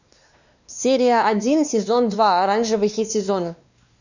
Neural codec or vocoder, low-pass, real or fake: codec, 16 kHz, 2 kbps, X-Codec, WavLM features, trained on Multilingual LibriSpeech; 7.2 kHz; fake